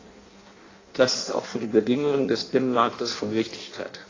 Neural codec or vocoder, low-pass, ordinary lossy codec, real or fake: codec, 16 kHz in and 24 kHz out, 0.6 kbps, FireRedTTS-2 codec; 7.2 kHz; AAC, 32 kbps; fake